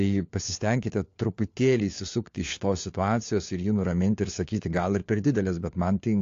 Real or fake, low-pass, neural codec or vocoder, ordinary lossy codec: fake; 7.2 kHz; codec, 16 kHz, 4 kbps, FunCodec, trained on LibriTTS, 50 frames a second; AAC, 48 kbps